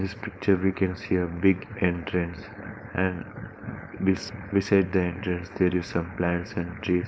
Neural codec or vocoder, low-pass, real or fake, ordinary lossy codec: codec, 16 kHz, 4.8 kbps, FACodec; none; fake; none